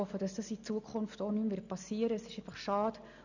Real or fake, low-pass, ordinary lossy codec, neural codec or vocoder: real; 7.2 kHz; none; none